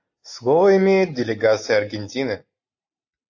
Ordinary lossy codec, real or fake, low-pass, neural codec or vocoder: AAC, 48 kbps; real; 7.2 kHz; none